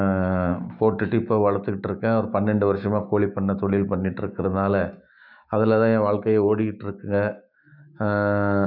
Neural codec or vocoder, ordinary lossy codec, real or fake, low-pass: autoencoder, 48 kHz, 128 numbers a frame, DAC-VAE, trained on Japanese speech; none; fake; 5.4 kHz